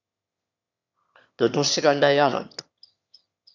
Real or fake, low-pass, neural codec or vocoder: fake; 7.2 kHz; autoencoder, 22.05 kHz, a latent of 192 numbers a frame, VITS, trained on one speaker